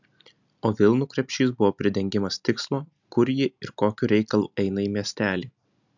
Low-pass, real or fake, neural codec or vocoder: 7.2 kHz; real; none